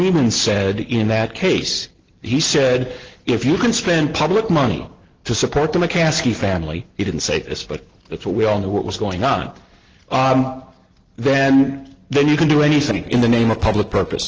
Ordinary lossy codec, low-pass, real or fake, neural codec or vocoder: Opus, 16 kbps; 7.2 kHz; real; none